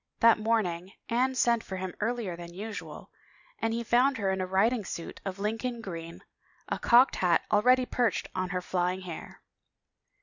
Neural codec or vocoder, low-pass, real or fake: none; 7.2 kHz; real